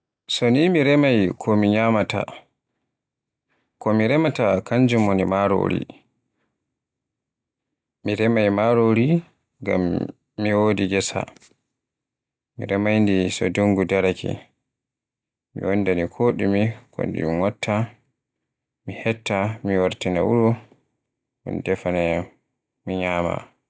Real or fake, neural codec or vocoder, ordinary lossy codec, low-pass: real; none; none; none